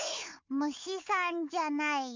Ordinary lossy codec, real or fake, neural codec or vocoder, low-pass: MP3, 64 kbps; fake; codec, 16 kHz, 4 kbps, FunCodec, trained on Chinese and English, 50 frames a second; 7.2 kHz